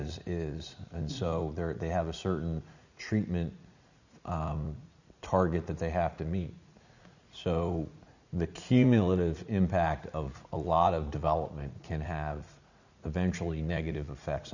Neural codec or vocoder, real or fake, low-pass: vocoder, 44.1 kHz, 80 mel bands, Vocos; fake; 7.2 kHz